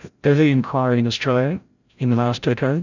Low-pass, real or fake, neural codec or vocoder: 7.2 kHz; fake; codec, 16 kHz, 0.5 kbps, FreqCodec, larger model